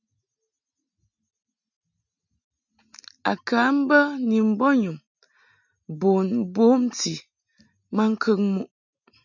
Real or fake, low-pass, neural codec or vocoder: real; 7.2 kHz; none